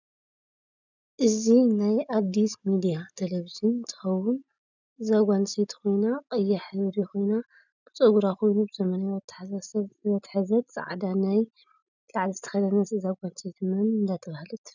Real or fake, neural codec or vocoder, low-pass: real; none; 7.2 kHz